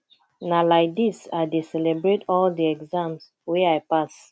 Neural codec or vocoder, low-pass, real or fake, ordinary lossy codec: none; none; real; none